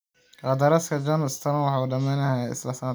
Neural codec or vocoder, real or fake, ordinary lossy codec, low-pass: none; real; none; none